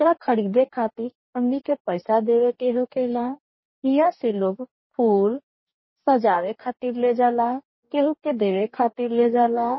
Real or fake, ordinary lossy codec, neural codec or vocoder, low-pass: fake; MP3, 24 kbps; codec, 44.1 kHz, 2.6 kbps, DAC; 7.2 kHz